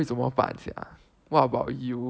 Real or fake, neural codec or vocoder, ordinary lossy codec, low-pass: real; none; none; none